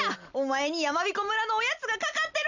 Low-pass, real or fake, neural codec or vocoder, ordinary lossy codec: 7.2 kHz; real; none; none